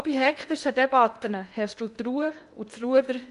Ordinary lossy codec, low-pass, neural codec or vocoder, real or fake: none; 10.8 kHz; codec, 16 kHz in and 24 kHz out, 0.8 kbps, FocalCodec, streaming, 65536 codes; fake